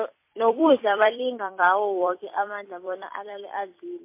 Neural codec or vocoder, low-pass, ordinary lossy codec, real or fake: vocoder, 44.1 kHz, 128 mel bands every 256 samples, BigVGAN v2; 3.6 kHz; MP3, 24 kbps; fake